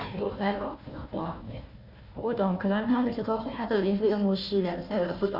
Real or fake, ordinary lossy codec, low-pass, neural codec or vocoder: fake; none; 5.4 kHz; codec, 16 kHz, 1 kbps, FunCodec, trained on Chinese and English, 50 frames a second